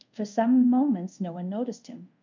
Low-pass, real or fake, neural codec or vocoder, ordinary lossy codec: 7.2 kHz; fake; codec, 24 kHz, 0.5 kbps, DualCodec; MP3, 64 kbps